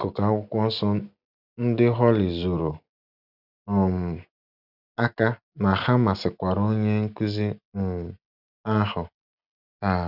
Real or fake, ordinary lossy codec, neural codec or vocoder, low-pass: real; none; none; 5.4 kHz